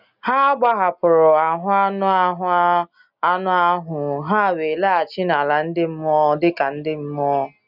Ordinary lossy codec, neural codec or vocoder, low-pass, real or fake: none; none; 5.4 kHz; real